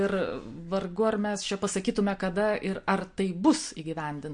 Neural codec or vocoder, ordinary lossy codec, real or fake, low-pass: none; MP3, 48 kbps; real; 9.9 kHz